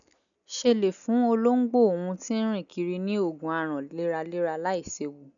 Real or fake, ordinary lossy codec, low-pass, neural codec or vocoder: real; none; 7.2 kHz; none